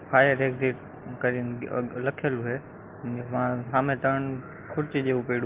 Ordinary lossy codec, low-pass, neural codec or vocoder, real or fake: Opus, 16 kbps; 3.6 kHz; none; real